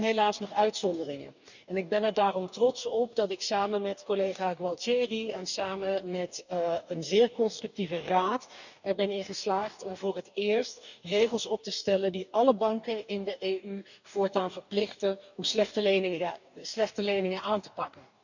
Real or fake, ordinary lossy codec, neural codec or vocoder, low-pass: fake; none; codec, 44.1 kHz, 2.6 kbps, DAC; 7.2 kHz